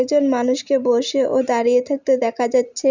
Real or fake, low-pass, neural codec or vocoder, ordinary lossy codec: real; 7.2 kHz; none; none